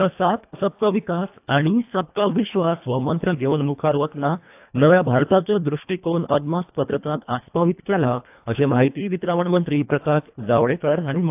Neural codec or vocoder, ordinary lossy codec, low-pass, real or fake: codec, 24 kHz, 1.5 kbps, HILCodec; AAC, 32 kbps; 3.6 kHz; fake